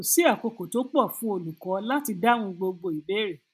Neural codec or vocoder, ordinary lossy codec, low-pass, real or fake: none; none; 14.4 kHz; real